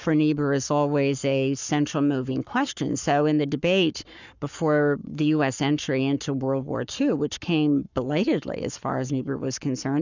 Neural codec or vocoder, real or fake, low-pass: codec, 44.1 kHz, 7.8 kbps, Pupu-Codec; fake; 7.2 kHz